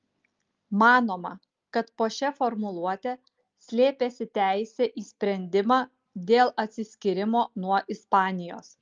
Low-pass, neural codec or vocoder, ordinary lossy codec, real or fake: 7.2 kHz; none; Opus, 32 kbps; real